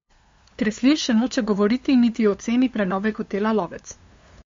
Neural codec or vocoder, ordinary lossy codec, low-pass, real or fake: codec, 16 kHz, 2 kbps, FunCodec, trained on LibriTTS, 25 frames a second; MP3, 48 kbps; 7.2 kHz; fake